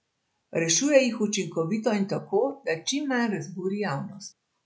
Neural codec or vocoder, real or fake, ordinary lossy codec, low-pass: none; real; none; none